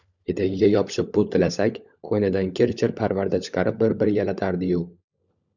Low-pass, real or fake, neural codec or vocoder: 7.2 kHz; fake; codec, 16 kHz, 16 kbps, FunCodec, trained on LibriTTS, 50 frames a second